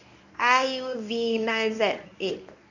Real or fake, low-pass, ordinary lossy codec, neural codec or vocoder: fake; 7.2 kHz; none; codec, 24 kHz, 0.9 kbps, WavTokenizer, medium speech release version 1